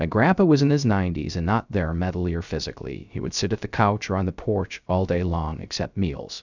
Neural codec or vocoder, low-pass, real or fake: codec, 16 kHz, 0.3 kbps, FocalCodec; 7.2 kHz; fake